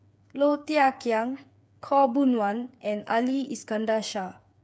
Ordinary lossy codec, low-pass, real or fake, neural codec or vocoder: none; none; fake; codec, 16 kHz, 8 kbps, FreqCodec, smaller model